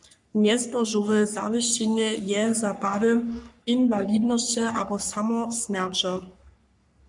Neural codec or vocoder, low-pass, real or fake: codec, 44.1 kHz, 3.4 kbps, Pupu-Codec; 10.8 kHz; fake